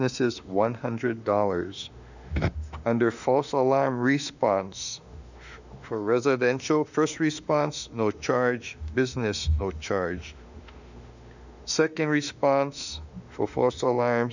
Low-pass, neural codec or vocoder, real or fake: 7.2 kHz; autoencoder, 48 kHz, 32 numbers a frame, DAC-VAE, trained on Japanese speech; fake